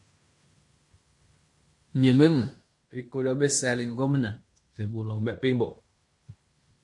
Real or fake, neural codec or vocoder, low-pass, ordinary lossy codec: fake; codec, 16 kHz in and 24 kHz out, 0.9 kbps, LongCat-Audio-Codec, fine tuned four codebook decoder; 10.8 kHz; MP3, 48 kbps